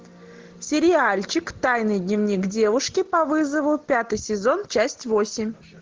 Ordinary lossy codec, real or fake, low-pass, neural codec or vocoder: Opus, 16 kbps; real; 7.2 kHz; none